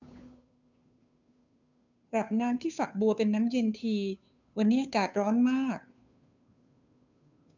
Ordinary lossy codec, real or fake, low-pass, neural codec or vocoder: none; fake; 7.2 kHz; codec, 16 kHz, 2 kbps, FunCodec, trained on Chinese and English, 25 frames a second